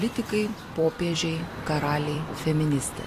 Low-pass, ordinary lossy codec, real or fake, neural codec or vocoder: 14.4 kHz; AAC, 48 kbps; fake; vocoder, 44.1 kHz, 128 mel bands every 256 samples, BigVGAN v2